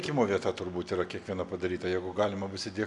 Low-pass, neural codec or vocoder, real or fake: 10.8 kHz; none; real